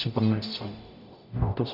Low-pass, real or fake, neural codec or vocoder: 5.4 kHz; fake; codec, 44.1 kHz, 0.9 kbps, DAC